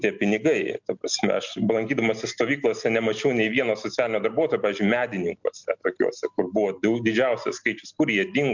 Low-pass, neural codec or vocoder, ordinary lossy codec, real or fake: 7.2 kHz; none; MP3, 64 kbps; real